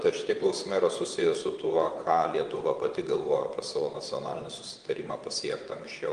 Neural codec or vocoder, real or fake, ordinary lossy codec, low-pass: vocoder, 22.05 kHz, 80 mel bands, WaveNeXt; fake; Opus, 24 kbps; 9.9 kHz